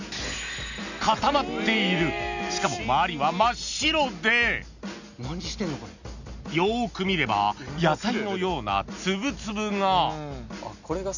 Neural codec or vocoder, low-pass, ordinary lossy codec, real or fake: none; 7.2 kHz; none; real